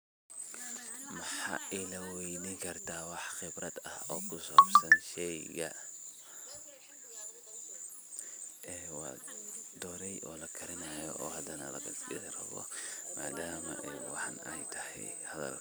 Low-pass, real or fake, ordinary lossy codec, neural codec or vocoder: none; fake; none; vocoder, 44.1 kHz, 128 mel bands every 256 samples, BigVGAN v2